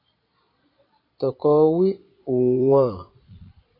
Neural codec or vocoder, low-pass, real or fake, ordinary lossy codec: vocoder, 22.05 kHz, 80 mel bands, Vocos; 5.4 kHz; fake; AAC, 24 kbps